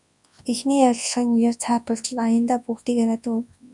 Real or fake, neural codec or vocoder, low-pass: fake; codec, 24 kHz, 0.9 kbps, WavTokenizer, large speech release; 10.8 kHz